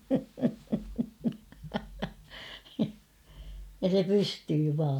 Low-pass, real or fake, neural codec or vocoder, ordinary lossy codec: 19.8 kHz; real; none; none